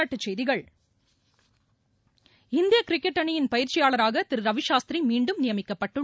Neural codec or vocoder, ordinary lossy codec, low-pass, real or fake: none; none; none; real